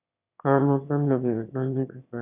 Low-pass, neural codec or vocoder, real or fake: 3.6 kHz; autoencoder, 22.05 kHz, a latent of 192 numbers a frame, VITS, trained on one speaker; fake